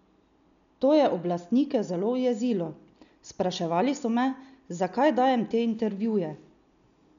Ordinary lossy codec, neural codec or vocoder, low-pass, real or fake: none; none; 7.2 kHz; real